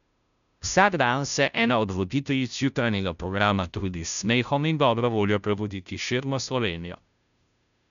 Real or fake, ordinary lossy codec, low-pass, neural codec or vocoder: fake; none; 7.2 kHz; codec, 16 kHz, 0.5 kbps, FunCodec, trained on Chinese and English, 25 frames a second